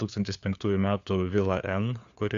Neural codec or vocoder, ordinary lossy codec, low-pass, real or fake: codec, 16 kHz, 4 kbps, FunCodec, trained on LibriTTS, 50 frames a second; Opus, 64 kbps; 7.2 kHz; fake